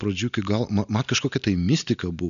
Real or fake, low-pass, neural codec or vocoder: real; 7.2 kHz; none